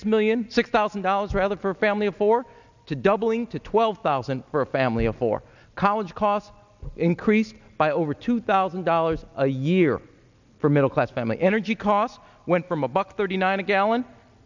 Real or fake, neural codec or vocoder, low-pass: real; none; 7.2 kHz